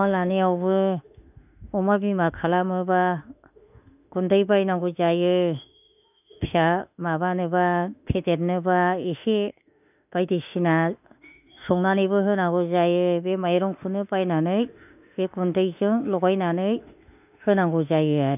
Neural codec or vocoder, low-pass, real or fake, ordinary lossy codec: autoencoder, 48 kHz, 32 numbers a frame, DAC-VAE, trained on Japanese speech; 3.6 kHz; fake; none